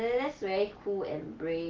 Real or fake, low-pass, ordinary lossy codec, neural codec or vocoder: real; 7.2 kHz; Opus, 16 kbps; none